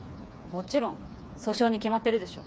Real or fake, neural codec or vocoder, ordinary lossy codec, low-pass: fake; codec, 16 kHz, 4 kbps, FreqCodec, smaller model; none; none